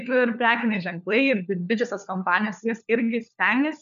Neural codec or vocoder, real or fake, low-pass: codec, 16 kHz, 4 kbps, FunCodec, trained on LibriTTS, 50 frames a second; fake; 7.2 kHz